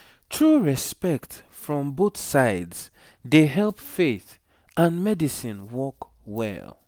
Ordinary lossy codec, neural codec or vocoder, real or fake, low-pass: none; none; real; none